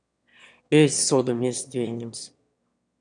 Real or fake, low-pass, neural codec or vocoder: fake; 9.9 kHz; autoencoder, 22.05 kHz, a latent of 192 numbers a frame, VITS, trained on one speaker